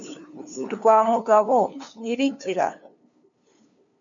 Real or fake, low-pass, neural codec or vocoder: fake; 7.2 kHz; codec, 16 kHz, 2 kbps, FunCodec, trained on LibriTTS, 25 frames a second